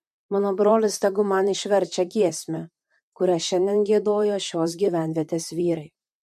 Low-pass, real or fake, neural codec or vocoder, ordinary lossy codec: 14.4 kHz; fake; vocoder, 44.1 kHz, 128 mel bands, Pupu-Vocoder; MP3, 64 kbps